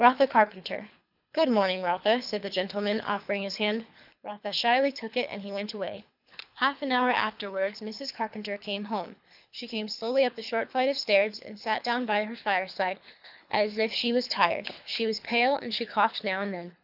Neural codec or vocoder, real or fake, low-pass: codec, 24 kHz, 3 kbps, HILCodec; fake; 5.4 kHz